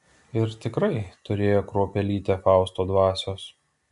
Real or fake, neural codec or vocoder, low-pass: real; none; 10.8 kHz